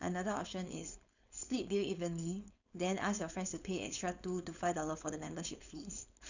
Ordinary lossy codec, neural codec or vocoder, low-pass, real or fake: none; codec, 16 kHz, 4.8 kbps, FACodec; 7.2 kHz; fake